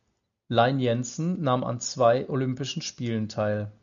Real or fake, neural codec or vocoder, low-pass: real; none; 7.2 kHz